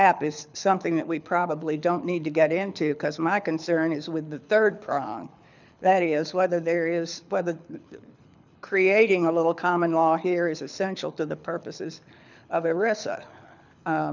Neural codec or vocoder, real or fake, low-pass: codec, 24 kHz, 6 kbps, HILCodec; fake; 7.2 kHz